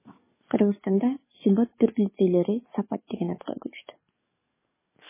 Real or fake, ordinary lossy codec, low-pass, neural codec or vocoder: fake; MP3, 16 kbps; 3.6 kHz; codec, 44.1 kHz, 7.8 kbps, DAC